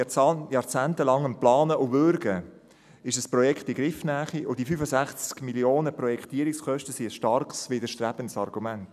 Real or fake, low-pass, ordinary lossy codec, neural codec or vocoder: real; 14.4 kHz; none; none